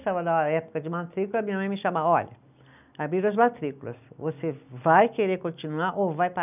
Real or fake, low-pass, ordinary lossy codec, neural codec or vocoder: real; 3.6 kHz; none; none